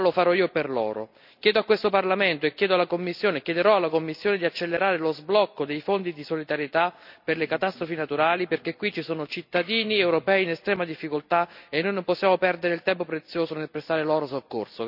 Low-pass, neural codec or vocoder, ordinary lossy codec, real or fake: 5.4 kHz; none; none; real